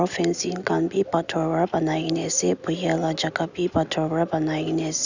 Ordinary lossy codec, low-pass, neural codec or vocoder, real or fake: none; 7.2 kHz; none; real